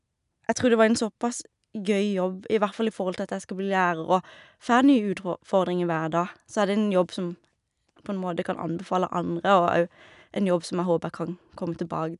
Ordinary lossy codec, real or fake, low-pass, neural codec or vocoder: none; real; 10.8 kHz; none